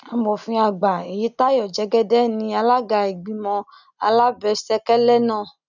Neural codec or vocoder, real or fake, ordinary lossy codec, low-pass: none; real; none; 7.2 kHz